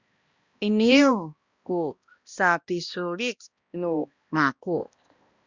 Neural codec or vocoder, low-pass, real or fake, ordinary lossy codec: codec, 16 kHz, 1 kbps, X-Codec, HuBERT features, trained on balanced general audio; 7.2 kHz; fake; Opus, 64 kbps